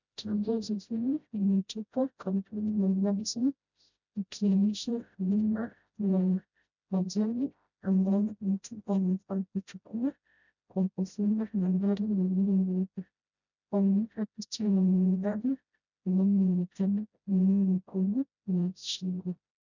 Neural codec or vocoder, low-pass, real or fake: codec, 16 kHz, 0.5 kbps, FreqCodec, smaller model; 7.2 kHz; fake